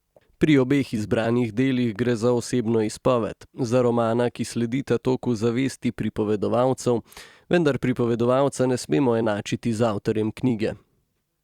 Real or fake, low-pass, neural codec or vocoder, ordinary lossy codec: fake; 19.8 kHz; vocoder, 44.1 kHz, 128 mel bands every 256 samples, BigVGAN v2; Opus, 64 kbps